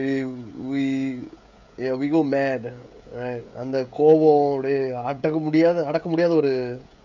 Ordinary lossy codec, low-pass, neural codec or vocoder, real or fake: none; 7.2 kHz; codec, 16 kHz, 16 kbps, FreqCodec, smaller model; fake